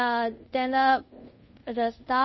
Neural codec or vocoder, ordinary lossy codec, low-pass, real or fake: codec, 24 kHz, 0.5 kbps, DualCodec; MP3, 24 kbps; 7.2 kHz; fake